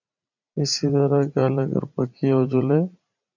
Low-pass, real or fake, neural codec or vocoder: 7.2 kHz; fake; vocoder, 44.1 kHz, 80 mel bands, Vocos